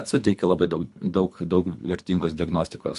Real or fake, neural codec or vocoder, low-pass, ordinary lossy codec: fake; codec, 24 kHz, 3 kbps, HILCodec; 10.8 kHz; MP3, 64 kbps